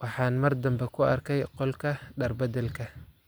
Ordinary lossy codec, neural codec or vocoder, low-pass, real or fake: none; none; none; real